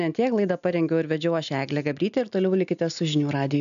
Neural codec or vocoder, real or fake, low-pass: none; real; 7.2 kHz